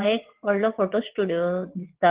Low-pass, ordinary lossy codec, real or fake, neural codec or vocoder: 3.6 kHz; Opus, 16 kbps; fake; vocoder, 22.05 kHz, 80 mel bands, WaveNeXt